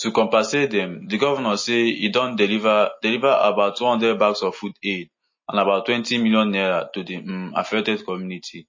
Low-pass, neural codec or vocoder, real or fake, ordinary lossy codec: 7.2 kHz; none; real; MP3, 32 kbps